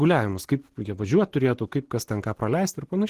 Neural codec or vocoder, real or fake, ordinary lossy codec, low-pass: none; real; Opus, 16 kbps; 14.4 kHz